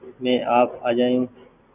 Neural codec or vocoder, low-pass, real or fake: none; 3.6 kHz; real